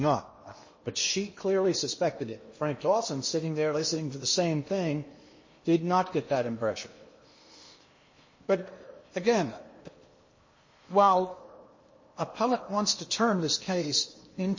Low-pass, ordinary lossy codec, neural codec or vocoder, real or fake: 7.2 kHz; MP3, 32 kbps; codec, 16 kHz in and 24 kHz out, 0.8 kbps, FocalCodec, streaming, 65536 codes; fake